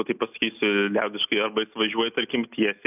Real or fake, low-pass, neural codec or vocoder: real; 3.6 kHz; none